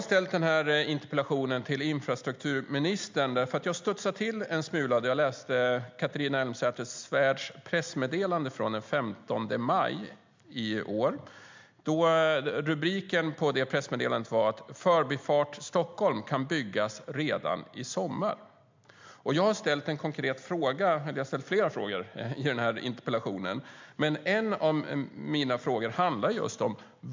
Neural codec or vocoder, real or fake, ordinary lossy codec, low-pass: none; real; MP3, 64 kbps; 7.2 kHz